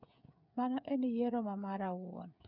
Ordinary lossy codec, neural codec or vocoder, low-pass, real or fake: none; codec, 16 kHz, 8 kbps, FreqCodec, smaller model; 5.4 kHz; fake